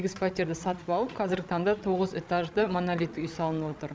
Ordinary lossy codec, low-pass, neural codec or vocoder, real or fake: none; none; codec, 16 kHz, 16 kbps, FreqCodec, larger model; fake